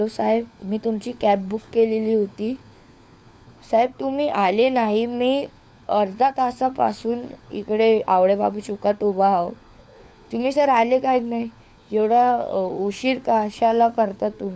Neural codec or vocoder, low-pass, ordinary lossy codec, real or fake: codec, 16 kHz, 4 kbps, FunCodec, trained on LibriTTS, 50 frames a second; none; none; fake